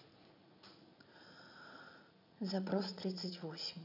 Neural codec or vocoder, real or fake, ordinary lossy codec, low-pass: vocoder, 44.1 kHz, 80 mel bands, Vocos; fake; none; 5.4 kHz